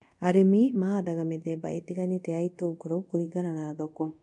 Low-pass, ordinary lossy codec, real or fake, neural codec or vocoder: 10.8 kHz; MP3, 64 kbps; fake; codec, 24 kHz, 0.5 kbps, DualCodec